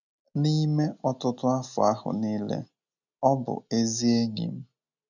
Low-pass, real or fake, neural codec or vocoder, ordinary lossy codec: 7.2 kHz; real; none; none